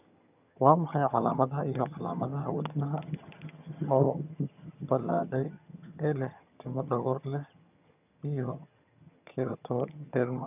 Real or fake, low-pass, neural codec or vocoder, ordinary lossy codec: fake; 3.6 kHz; vocoder, 22.05 kHz, 80 mel bands, HiFi-GAN; none